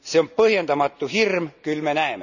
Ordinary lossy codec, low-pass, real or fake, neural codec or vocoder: none; 7.2 kHz; real; none